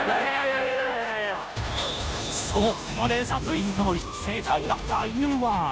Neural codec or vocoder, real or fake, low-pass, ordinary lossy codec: codec, 16 kHz, 0.5 kbps, FunCodec, trained on Chinese and English, 25 frames a second; fake; none; none